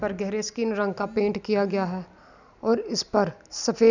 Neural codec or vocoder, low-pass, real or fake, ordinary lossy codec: none; 7.2 kHz; real; none